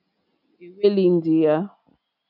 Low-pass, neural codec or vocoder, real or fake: 5.4 kHz; none; real